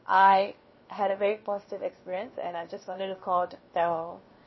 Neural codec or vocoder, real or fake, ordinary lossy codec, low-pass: codec, 16 kHz, 0.7 kbps, FocalCodec; fake; MP3, 24 kbps; 7.2 kHz